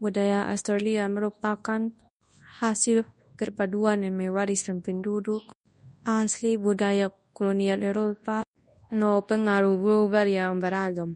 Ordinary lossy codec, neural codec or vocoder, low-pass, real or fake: MP3, 48 kbps; codec, 24 kHz, 0.9 kbps, WavTokenizer, large speech release; 10.8 kHz; fake